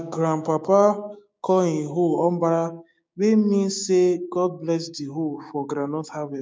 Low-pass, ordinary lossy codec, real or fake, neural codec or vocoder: none; none; fake; codec, 16 kHz, 6 kbps, DAC